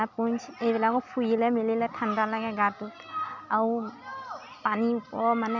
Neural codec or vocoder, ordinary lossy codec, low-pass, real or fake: none; none; 7.2 kHz; real